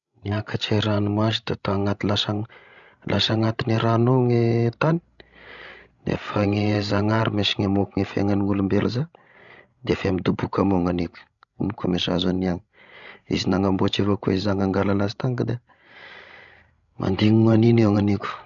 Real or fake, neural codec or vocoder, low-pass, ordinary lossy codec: fake; codec, 16 kHz, 16 kbps, FreqCodec, larger model; 7.2 kHz; none